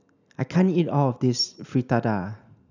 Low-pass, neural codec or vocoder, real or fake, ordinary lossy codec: 7.2 kHz; none; real; none